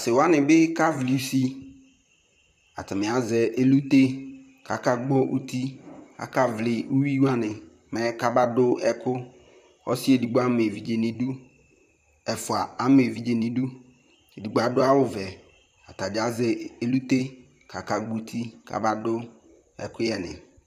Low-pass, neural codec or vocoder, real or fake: 14.4 kHz; vocoder, 44.1 kHz, 128 mel bands, Pupu-Vocoder; fake